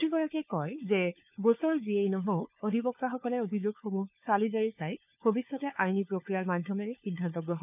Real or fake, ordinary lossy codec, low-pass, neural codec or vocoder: fake; none; 3.6 kHz; codec, 16 kHz, 4 kbps, FunCodec, trained on LibriTTS, 50 frames a second